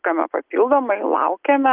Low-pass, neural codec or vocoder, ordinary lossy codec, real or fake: 3.6 kHz; none; Opus, 24 kbps; real